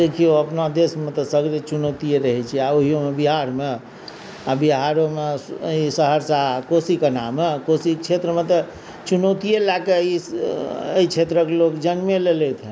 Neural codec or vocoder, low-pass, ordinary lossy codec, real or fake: none; none; none; real